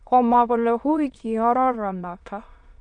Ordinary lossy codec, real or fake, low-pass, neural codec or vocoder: none; fake; 9.9 kHz; autoencoder, 22.05 kHz, a latent of 192 numbers a frame, VITS, trained on many speakers